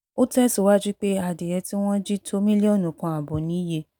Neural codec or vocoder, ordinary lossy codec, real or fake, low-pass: none; none; real; none